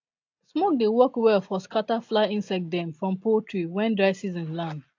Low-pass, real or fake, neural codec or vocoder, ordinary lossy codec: 7.2 kHz; real; none; AAC, 48 kbps